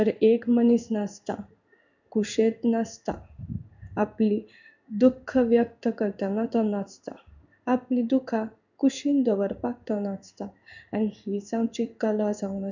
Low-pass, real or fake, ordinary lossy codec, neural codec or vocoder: 7.2 kHz; fake; none; codec, 16 kHz in and 24 kHz out, 1 kbps, XY-Tokenizer